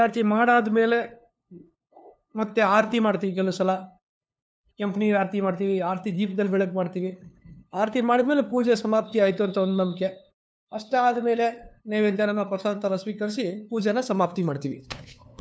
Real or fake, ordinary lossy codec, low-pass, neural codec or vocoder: fake; none; none; codec, 16 kHz, 2 kbps, FunCodec, trained on LibriTTS, 25 frames a second